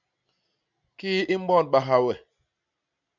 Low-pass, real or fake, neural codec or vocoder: 7.2 kHz; real; none